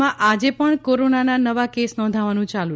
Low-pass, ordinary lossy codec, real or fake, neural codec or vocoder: none; none; real; none